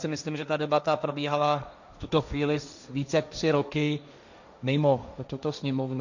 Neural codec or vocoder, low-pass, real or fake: codec, 16 kHz, 1.1 kbps, Voila-Tokenizer; 7.2 kHz; fake